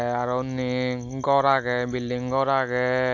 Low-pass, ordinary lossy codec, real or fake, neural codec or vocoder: 7.2 kHz; AAC, 48 kbps; real; none